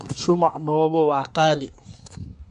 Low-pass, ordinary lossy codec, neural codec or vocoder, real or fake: 10.8 kHz; MP3, 64 kbps; codec, 24 kHz, 1 kbps, SNAC; fake